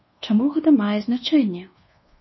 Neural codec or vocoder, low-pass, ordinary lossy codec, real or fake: codec, 24 kHz, 1.2 kbps, DualCodec; 7.2 kHz; MP3, 24 kbps; fake